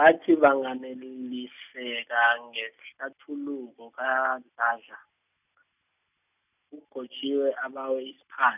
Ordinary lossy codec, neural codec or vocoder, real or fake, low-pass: none; none; real; 3.6 kHz